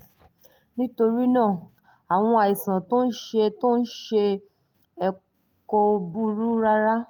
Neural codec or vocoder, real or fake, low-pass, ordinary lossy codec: none; real; 19.8 kHz; Opus, 32 kbps